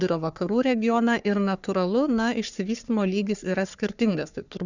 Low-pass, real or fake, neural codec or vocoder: 7.2 kHz; fake; codec, 44.1 kHz, 3.4 kbps, Pupu-Codec